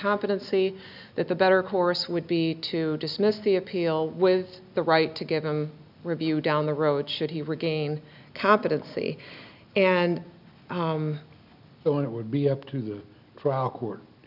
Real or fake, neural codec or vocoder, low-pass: real; none; 5.4 kHz